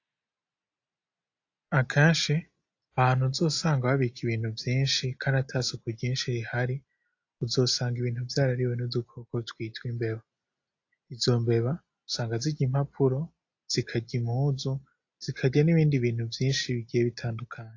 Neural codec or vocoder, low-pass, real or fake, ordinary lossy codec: none; 7.2 kHz; real; AAC, 48 kbps